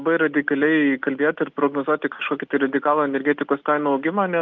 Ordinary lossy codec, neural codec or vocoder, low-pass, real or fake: Opus, 24 kbps; none; 7.2 kHz; real